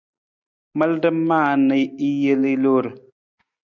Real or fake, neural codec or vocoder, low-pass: real; none; 7.2 kHz